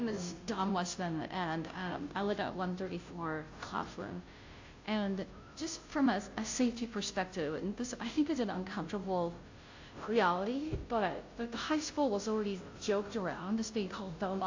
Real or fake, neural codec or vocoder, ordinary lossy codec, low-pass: fake; codec, 16 kHz, 0.5 kbps, FunCodec, trained on Chinese and English, 25 frames a second; AAC, 48 kbps; 7.2 kHz